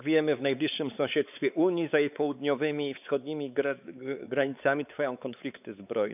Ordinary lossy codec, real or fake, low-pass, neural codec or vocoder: none; fake; 3.6 kHz; codec, 16 kHz, 4 kbps, X-Codec, WavLM features, trained on Multilingual LibriSpeech